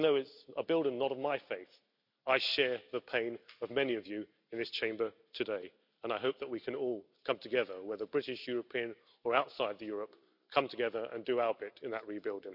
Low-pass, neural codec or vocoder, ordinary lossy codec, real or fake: 5.4 kHz; none; none; real